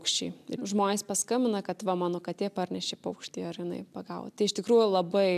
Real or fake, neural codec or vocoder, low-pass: real; none; 14.4 kHz